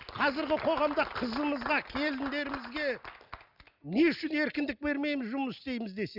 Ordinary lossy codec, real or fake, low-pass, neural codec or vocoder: none; real; 5.4 kHz; none